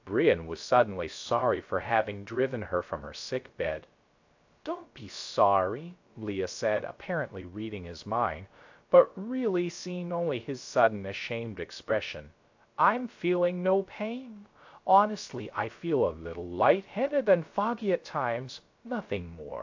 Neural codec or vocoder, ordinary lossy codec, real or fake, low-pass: codec, 16 kHz, 0.3 kbps, FocalCodec; AAC, 48 kbps; fake; 7.2 kHz